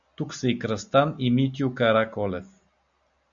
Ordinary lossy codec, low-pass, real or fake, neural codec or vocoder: MP3, 64 kbps; 7.2 kHz; real; none